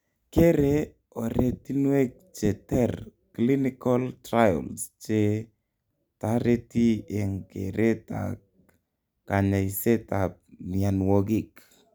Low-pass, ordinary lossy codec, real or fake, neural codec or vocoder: none; none; real; none